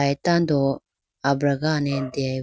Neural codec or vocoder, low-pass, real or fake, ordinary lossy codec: none; none; real; none